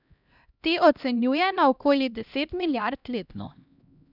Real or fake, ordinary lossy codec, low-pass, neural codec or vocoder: fake; none; 5.4 kHz; codec, 16 kHz, 1 kbps, X-Codec, HuBERT features, trained on LibriSpeech